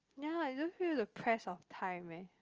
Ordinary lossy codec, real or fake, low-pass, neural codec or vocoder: Opus, 24 kbps; real; 7.2 kHz; none